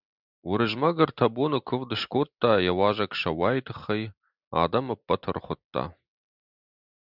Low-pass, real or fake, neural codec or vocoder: 5.4 kHz; real; none